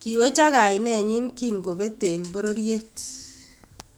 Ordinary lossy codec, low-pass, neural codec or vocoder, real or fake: none; none; codec, 44.1 kHz, 2.6 kbps, SNAC; fake